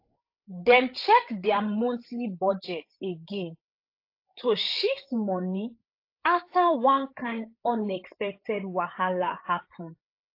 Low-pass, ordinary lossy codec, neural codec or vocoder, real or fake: 5.4 kHz; AAC, 32 kbps; codec, 16 kHz, 8 kbps, FreqCodec, larger model; fake